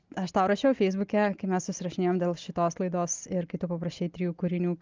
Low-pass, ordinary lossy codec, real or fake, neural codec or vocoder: 7.2 kHz; Opus, 24 kbps; real; none